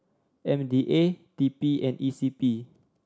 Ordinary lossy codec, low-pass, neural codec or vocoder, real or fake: none; none; none; real